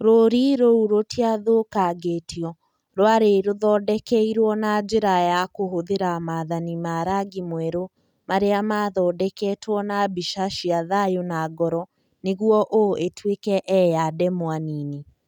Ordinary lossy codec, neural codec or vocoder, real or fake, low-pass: none; none; real; 19.8 kHz